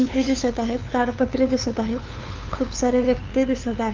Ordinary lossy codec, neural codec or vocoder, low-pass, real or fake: Opus, 24 kbps; codec, 16 kHz, 2 kbps, FunCodec, trained on LibriTTS, 25 frames a second; 7.2 kHz; fake